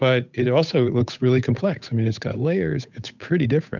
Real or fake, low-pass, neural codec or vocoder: fake; 7.2 kHz; codec, 16 kHz, 8 kbps, FunCodec, trained on Chinese and English, 25 frames a second